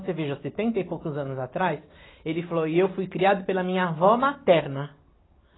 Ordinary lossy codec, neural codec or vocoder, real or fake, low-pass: AAC, 16 kbps; none; real; 7.2 kHz